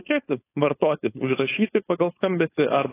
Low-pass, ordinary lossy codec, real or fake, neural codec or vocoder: 3.6 kHz; AAC, 16 kbps; fake; codec, 16 kHz, 4.8 kbps, FACodec